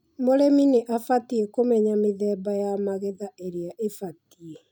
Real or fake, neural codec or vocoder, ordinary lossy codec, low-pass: real; none; none; none